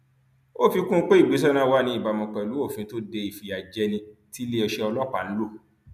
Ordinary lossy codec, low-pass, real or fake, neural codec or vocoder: none; 14.4 kHz; real; none